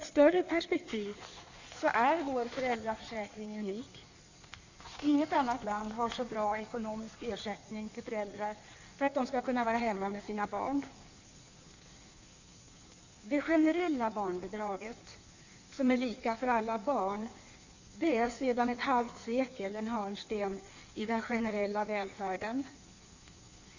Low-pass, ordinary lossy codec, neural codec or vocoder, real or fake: 7.2 kHz; none; codec, 16 kHz in and 24 kHz out, 1.1 kbps, FireRedTTS-2 codec; fake